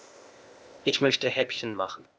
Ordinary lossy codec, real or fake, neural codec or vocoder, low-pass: none; fake; codec, 16 kHz, 0.8 kbps, ZipCodec; none